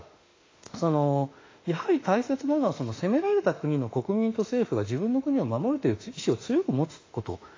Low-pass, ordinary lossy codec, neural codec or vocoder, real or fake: 7.2 kHz; AAC, 32 kbps; autoencoder, 48 kHz, 32 numbers a frame, DAC-VAE, trained on Japanese speech; fake